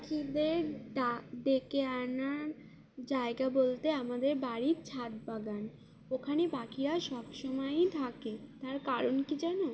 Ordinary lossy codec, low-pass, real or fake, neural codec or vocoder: none; none; real; none